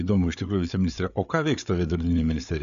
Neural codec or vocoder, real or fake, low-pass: codec, 16 kHz, 8 kbps, FreqCodec, larger model; fake; 7.2 kHz